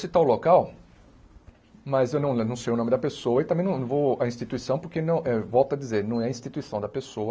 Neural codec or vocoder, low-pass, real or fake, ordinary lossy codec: none; none; real; none